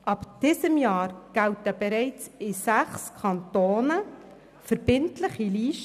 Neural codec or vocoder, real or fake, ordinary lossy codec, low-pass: none; real; none; 14.4 kHz